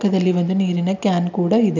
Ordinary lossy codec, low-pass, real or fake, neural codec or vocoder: none; 7.2 kHz; real; none